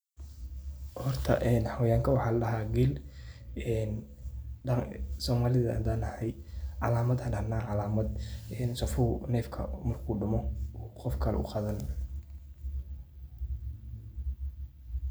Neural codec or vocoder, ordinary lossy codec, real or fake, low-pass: none; none; real; none